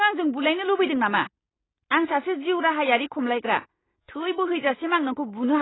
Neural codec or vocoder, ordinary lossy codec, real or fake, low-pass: none; AAC, 16 kbps; real; 7.2 kHz